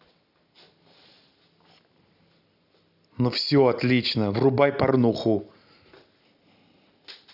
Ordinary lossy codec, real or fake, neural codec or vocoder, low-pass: none; real; none; 5.4 kHz